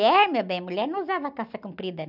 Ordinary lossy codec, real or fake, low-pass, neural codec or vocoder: none; real; 5.4 kHz; none